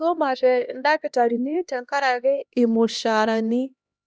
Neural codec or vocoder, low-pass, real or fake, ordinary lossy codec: codec, 16 kHz, 1 kbps, X-Codec, HuBERT features, trained on LibriSpeech; none; fake; none